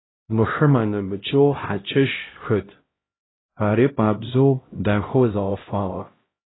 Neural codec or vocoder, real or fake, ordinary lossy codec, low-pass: codec, 16 kHz, 0.5 kbps, X-Codec, HuBERT features, trained on LibriSpeech; fake; AAC, 16 kbps; 7.2 kHz